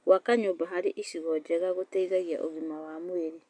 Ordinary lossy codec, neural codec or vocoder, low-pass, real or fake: none; none; 9.9 kHz; real